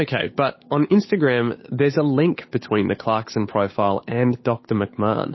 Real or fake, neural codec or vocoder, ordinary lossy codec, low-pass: fake; codec, 16 kHz, 8 kbps, FunCodec, trained on Chinese and English, 25 frames a second; MP3, 24 kbps; 7.2 kHz